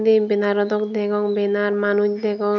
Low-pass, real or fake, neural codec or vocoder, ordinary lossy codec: 7.2 kHz; real; none; none